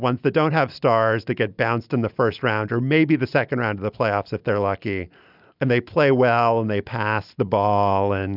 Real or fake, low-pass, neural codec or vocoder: real; 5.4 kHz; none